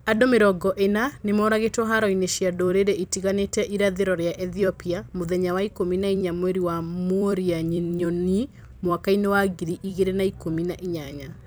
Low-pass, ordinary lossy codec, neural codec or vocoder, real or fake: none; none; vocoder, 44.1 kHz, 128 mel bands every 256 samples, BigVGAN v2; fake